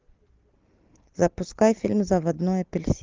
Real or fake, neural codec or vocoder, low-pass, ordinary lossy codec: real; none; 7.2 kHz; Opus, 32 kbps